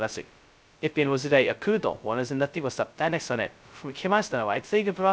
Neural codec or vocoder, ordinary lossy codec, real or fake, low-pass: codec, 16 kHz, 0.2 kbps, FocalCodec; none; fake; none